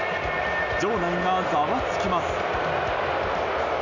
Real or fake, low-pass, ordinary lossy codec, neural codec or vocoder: real; 7.2 kHz; none; none